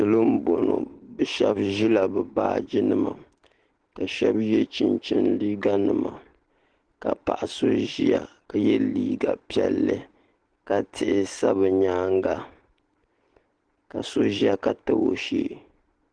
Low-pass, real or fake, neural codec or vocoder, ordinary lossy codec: 9.9 kHz; real; none; Opus, 16 kbps